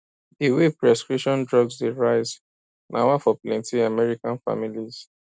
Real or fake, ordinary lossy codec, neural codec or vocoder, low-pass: real; none; none; none